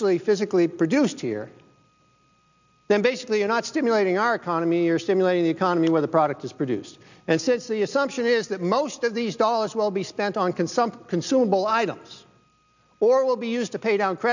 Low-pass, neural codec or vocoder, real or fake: 7.2 kHz; none; real